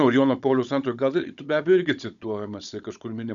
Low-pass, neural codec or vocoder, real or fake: 7.2 kHz; codec, 16 kHz, 16 kbps, FunCodec, trained on Chinese and English, 50 frames a second; fake